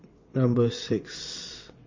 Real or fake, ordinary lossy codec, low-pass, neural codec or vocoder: fake; MP3, 32 kbps; 7.2 kHz; vocoder, 22.05 kHz, 80 mel bands, WaveNeXt